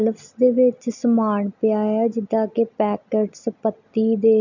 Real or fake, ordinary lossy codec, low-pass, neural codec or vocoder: real; none; 7.2 kHz; none